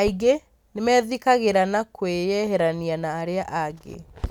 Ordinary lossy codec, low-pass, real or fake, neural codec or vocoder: none; 19.8 kHz; real; none